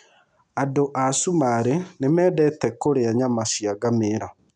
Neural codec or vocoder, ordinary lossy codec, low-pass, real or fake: none; none; 9.9 kHz; real